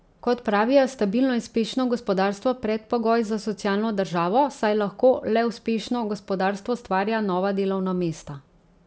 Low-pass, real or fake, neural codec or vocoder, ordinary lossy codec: none; real; none; none